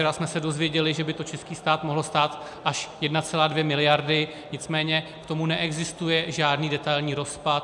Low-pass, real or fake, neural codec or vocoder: 10.8 kHz; real; none